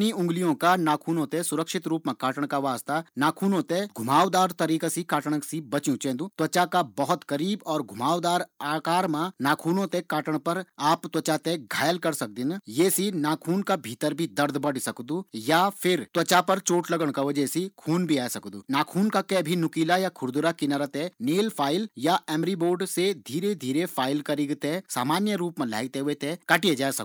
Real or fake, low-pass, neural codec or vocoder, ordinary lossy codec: real; none; none; none